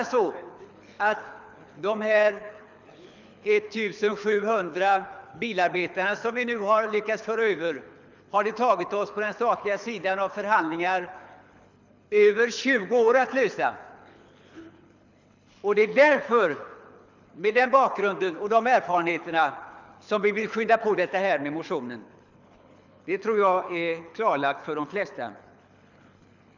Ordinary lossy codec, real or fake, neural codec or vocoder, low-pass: none; fake; codec, 24 kHz, 6 kbps, HILCodec; 7.2 kHz